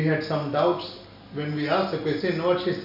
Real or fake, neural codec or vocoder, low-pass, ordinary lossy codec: real; none; 5.4 kHz; none